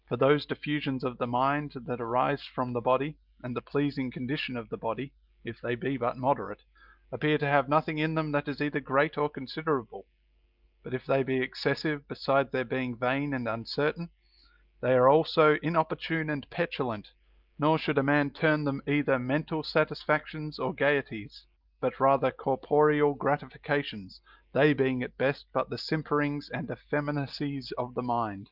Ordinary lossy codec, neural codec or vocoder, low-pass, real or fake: Opus, 32 kbps; none; 5.4 kHz; real